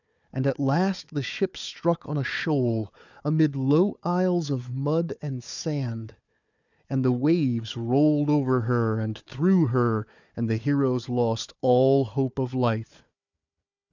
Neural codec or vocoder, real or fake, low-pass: codec, 16 kHz, 4 kbps, FunCodec, trained on Chinese and English, 50 frames a second; fake; 7.2 kHz